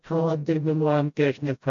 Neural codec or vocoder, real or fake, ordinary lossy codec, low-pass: codec, 16 kHz, 0.5 kbps, FreqCodec, smaller model; fake; MP3, 48 kbps; 7.2 kHz